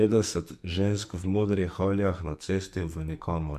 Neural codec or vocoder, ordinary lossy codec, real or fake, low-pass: codec, 44.1 kHz, 2.6 kbps, SNAC; MP3, 96 kbps; fake; 14.4 kHz